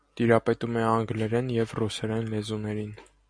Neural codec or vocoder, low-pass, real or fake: none; 9.9 kHz; real